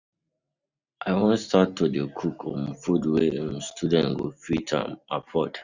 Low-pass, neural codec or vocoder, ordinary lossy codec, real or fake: 7.2 kHz; none; Opus, 64 kbps; real